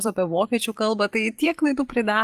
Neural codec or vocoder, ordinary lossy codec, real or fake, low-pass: vocoder, 44.1 kHz, 128 mel bands every 256 samples, BigVGAN v2; Opus, 24 kbps; fake; 14.4 kHz